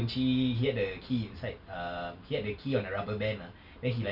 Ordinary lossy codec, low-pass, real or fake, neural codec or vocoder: none; 5.4 kHz; real; none